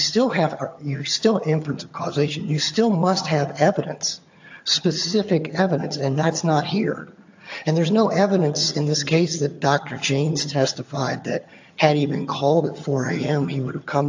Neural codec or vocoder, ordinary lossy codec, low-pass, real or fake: vocoder, 22.05 kHz, 80 mel bands, HiFi-GAN; AAC, 48 kbps; 7.2 kHz; fake